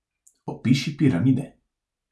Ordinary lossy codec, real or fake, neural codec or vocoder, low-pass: none; real; none; none